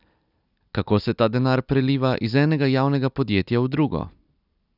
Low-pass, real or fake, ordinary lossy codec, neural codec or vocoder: 5.4 kHz; real; none; none